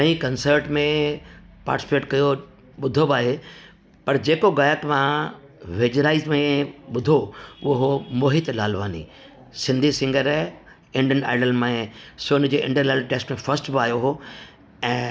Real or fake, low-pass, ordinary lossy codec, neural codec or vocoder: real; none; none; none